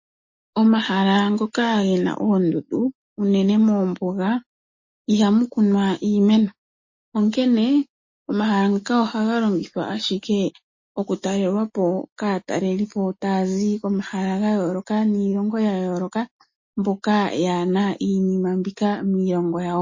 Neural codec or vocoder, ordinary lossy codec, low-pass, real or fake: none; MP3, 32 kbps; 7.2 kHz; real